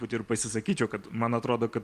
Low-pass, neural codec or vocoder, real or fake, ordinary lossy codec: 14.4 kHz; vocoder, 44.1 kHz, 128 mel bands every 256 samples, BigVGAN v2; fake; Opus, 32 kbps